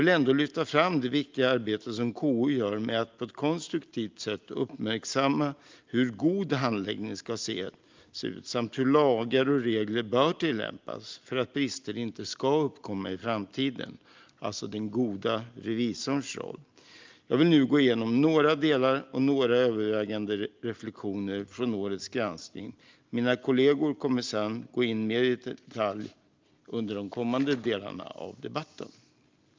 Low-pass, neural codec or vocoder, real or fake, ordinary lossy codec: 7.2 kHz; none; real; Opus, 24 kbps